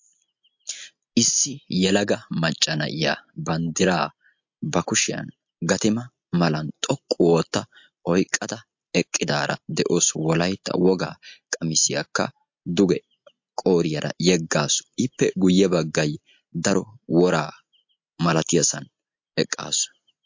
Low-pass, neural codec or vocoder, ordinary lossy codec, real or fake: 7.2 kHz; none; MP3, 48 kbps; real